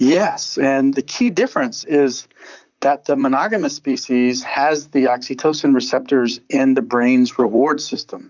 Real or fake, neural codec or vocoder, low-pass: fake; vocoder, 44.1 kHz, 128 mel bands, Pupu-Vocoder; 7.2 kHz